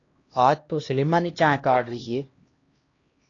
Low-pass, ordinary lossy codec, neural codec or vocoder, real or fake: 7.2 kHz; AAC, 32 kbps; codec, 16 kHz, 1 kbps, X-Codec, HuBERT features, trained on LibriSpeech; fake